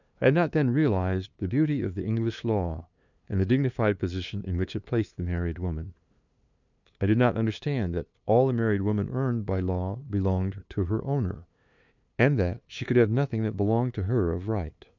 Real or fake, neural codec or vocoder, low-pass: fake; codec, 16 kHz, 2 kbps, FunCodec, trained on LibriTTS, 25 frames a second; 7.2 kHz